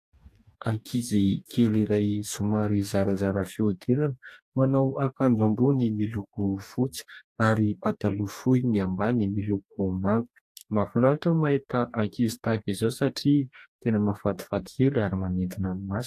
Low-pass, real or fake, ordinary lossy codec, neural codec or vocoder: 14.4 kHz; fake; AAC, 64 kbps; codec, 44.1 kHz, 2.6 kbps, DAC